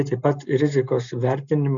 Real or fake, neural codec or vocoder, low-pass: real; none; 7.2 kHz